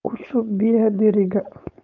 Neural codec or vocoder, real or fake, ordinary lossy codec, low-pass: codec, 16 kHz, 4.8 kbps, FACodec; fake; none; 7.2 kHz